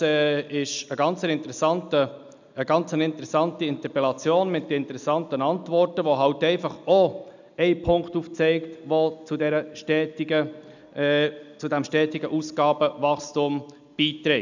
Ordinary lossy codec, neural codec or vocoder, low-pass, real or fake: none; none; 7.2 kHz; real